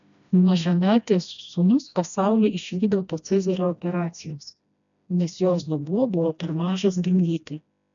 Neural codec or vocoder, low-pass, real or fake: codec, 16 kHz, 1 kbps, FreqCodec, smaller model; 7.2 kHz; fake